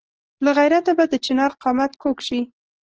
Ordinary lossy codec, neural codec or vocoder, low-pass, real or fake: Opus, 16 kbps; none; 7.2 kHz; real